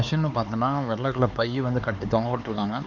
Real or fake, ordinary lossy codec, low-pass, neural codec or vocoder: fake; none; 7.2 kHz; codec, 16 kHz, 4 kbps, X-Codec, HuBERT features, trained on LibriSpeech